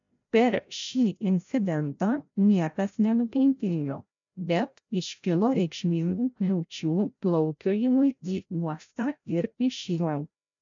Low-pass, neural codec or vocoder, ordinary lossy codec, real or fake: 7.2 kHz; codec, 16 kHz, 0.5 kbps, FreqCodec, larger model; MP3, 64 kbps; fake